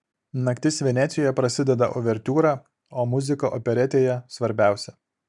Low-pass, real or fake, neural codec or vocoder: 10.8 kHz; real; none